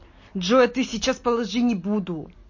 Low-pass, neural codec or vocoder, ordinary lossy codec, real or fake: 7.2 kHz; none; MP3, 32 kbps; real